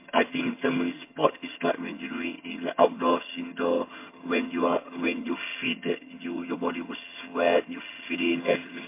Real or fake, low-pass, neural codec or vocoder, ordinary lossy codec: fake; 3.6 kHz; vocoder, 22.05 kHz, 80 mel bands, HiFi-GAN; MP3, 24 kbps